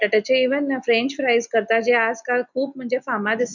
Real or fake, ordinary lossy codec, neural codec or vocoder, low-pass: real; none; none; 7.2 kHz